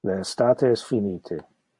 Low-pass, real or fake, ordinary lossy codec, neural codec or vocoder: 10.8 kHz; real; MP3, 48 kbps; none